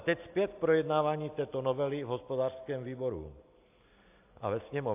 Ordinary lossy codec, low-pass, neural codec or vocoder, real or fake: AAC, 32 kbps; 3.6 kHz; none; real